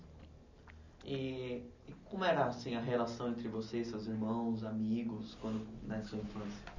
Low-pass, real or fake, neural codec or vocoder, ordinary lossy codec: 7.2 kHz; real; none; none